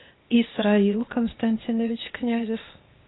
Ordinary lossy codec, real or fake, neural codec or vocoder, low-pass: AAC, 16 kbps; fake; codec, 16 kHz, 0.8 kbps, ZipCodec; 7.2 kHz